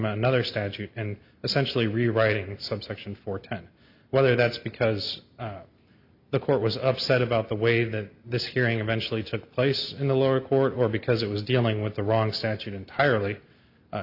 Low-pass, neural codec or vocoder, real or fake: 5.4 kHz; none; real